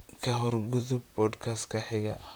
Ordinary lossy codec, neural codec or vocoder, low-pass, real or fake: none; vocoder, 44.1 kHz, 128 mel bands, Pupu-Vocoder; none; fake